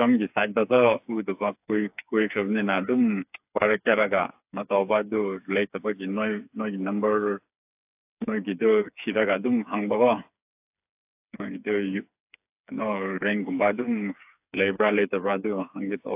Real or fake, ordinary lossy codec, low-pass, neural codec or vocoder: fake; AAC, 32 kbps; 3.6 kHz; codec, 16 kHz, 4 kbps, FreqCodec, smaller model